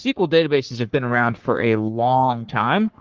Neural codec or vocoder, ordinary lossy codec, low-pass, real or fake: codec, 44.1 kHz, 3.4 kbps, Pupu-Codec; Opus, 16 kbps; 7.2 kHz; fake